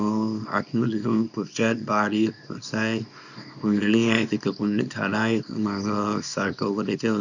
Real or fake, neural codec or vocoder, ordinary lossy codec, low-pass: fake; codec, 24 kHz, 0.9 kbps, WavTokenizer, small release; none; 7.2 kHz